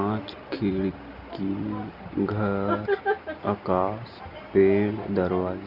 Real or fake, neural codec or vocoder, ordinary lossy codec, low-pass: real; none; Opus, 64 kbps; 5.4 kHz